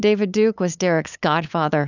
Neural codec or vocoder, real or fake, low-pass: codec, 16 kHz, 8 kbps, FunCodec, trained on LibriTTS, 25 frames a second; fake; 7.2 kHz